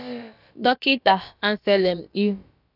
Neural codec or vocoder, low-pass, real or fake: codec, 16 kHz, about 1 kbps, DyCAST, with the encoder's durations; 5.4 kHz; fake